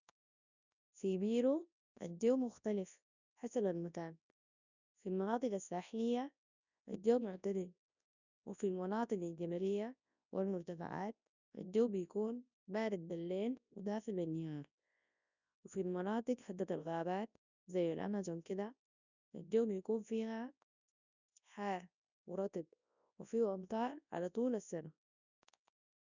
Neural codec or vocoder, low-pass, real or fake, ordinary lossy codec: codec, 24 kHz, 0.9 kbps, WavTokenizer, large speech release; 7.2 kHz; fake; none